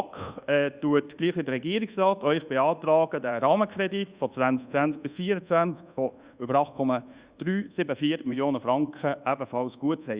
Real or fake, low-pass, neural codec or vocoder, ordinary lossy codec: fake; 3.6 kHz; codec, 24 kHz, 1.2 kbps, DualCodec; Opus, 64 kbps